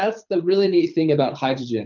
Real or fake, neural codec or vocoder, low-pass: fake; codec, 24 kHz, 6 kbps, HILCodec; 7.2 kHz